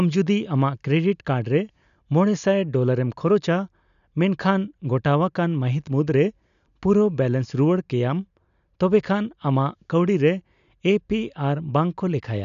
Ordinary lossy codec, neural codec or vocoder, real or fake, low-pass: none; none; real; 7.2 kHz